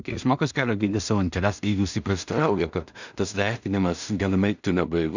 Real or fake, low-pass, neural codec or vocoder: fake; 7.2 kHz; codec, 16 kHz in and 24 kHz out, 0.4 kbps, LongCat-Audio-Codec, two codebook decoder